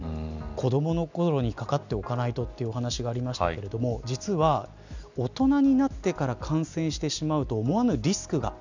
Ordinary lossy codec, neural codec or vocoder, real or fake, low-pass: none; none; real; 7.2 kHz